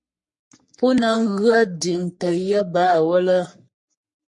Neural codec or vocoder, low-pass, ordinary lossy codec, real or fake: codec, 44.1 kHz, 3.4 kbps, Pupu-Codec; 10.8 kHz; MP3, 48 kbps; fake